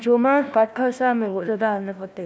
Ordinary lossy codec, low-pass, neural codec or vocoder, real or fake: none; none; codec, 16 kHz, 1 kbps, FunCodec, trained on Chinese and English, 50 frames a second; fake